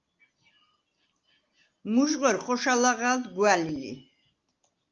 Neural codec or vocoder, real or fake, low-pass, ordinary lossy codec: none; real; 7.2 kHz; Opus, 24 kbps